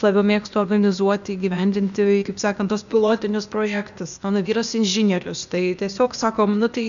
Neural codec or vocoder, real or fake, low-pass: codec, 16 kHz, 0.8 kbps, ZipCodec; fake; 7.2 kHz